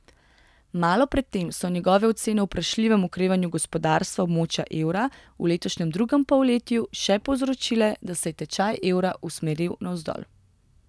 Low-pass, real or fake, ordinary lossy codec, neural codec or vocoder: none; real; none; none